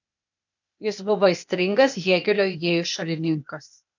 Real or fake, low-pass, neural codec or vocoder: fake; 7.2 kHz; codec, 16 kHz, 0.8 kbps, ZipCodec